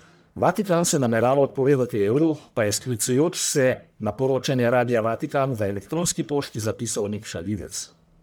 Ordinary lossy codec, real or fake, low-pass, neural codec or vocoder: none; fake; none; codec, 44.1 kHz, 1.7 kbps, Pupu-Codec